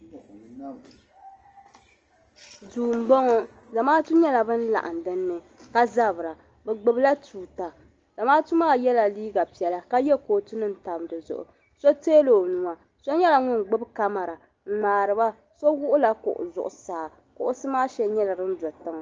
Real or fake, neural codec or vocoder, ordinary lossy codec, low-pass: real; none; Opus, 24 kbps; 7.2 kHz